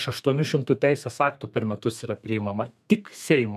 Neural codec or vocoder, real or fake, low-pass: codec, 32 kHz, 1.9 kbps, SNAC; fake; 14.4 kHz